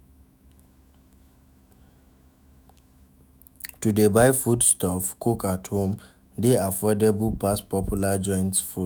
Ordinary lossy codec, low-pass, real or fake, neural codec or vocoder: none; none; fake; autoencoder, 48 kHz, 128 numbers a frame, DAC-VAE, trained on Japanese speech